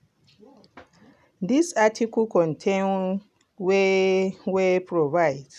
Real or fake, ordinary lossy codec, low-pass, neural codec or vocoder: real; none; 14.4 kHz; none